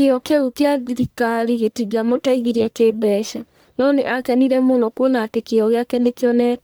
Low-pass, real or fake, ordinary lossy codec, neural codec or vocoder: none; fake; none; codec, 44.1 kHz, 1.7 kbps, Pupu-Codec